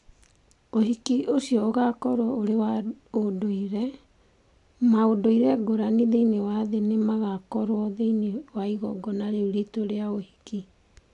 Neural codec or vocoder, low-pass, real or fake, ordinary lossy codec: none; 10.8 kHz; real; AAC, 64 kbps